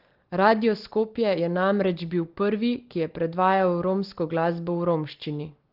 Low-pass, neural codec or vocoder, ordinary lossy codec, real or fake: 5.4 kHz; none; Opus, 16 kbps; real